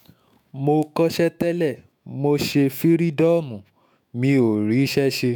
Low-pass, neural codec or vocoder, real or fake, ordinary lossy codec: none; autoencoder, 48 kHz, 128 numbers a frame, DAC-VAE, trained on Japanese speech; fake; none